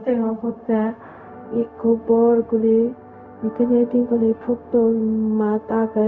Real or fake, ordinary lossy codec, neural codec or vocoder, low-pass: fake; none; codec, 16 kHz, 0.4 kbps, LongCat-Audio-Codec; 7.2 kHz